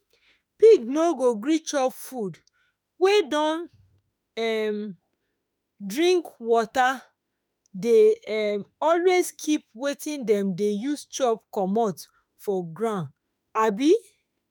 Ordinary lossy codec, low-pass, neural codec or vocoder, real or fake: none; none; autoencoder, 48 kHz, 32 numbers a frame, DAC-VAE, trained on Japanese speech; fake